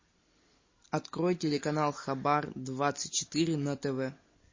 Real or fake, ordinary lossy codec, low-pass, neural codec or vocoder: real; MP3, 32 kbps; 7.2 kHz; none